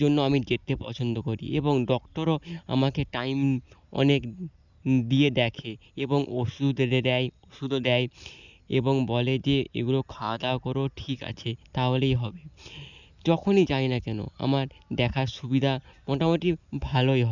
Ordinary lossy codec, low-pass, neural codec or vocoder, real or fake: none; 7.2 kHz; none; real